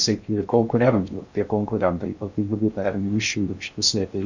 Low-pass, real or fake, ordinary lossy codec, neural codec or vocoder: 7.2 kHz; fake; Opus, 64 kbps; codec, 16 kHz in and 24 kHz out, 0.6 kbps, FocalCodec, streaming, 4096 codes